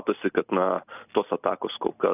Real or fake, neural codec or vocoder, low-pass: real; none; 3.6 kHz